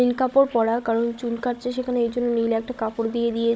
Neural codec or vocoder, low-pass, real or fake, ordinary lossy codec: codec, 16 kHz, 16 kbps, FunCodec, trained on Chinese and English, 50 frames a second; none; fake; none